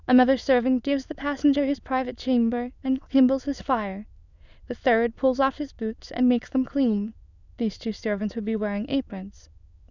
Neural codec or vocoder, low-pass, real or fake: autoencoder, 22.05 kHz, a latent of 192 numbers a frame, VITS, trained on many speakers; 7.2 kHz; fake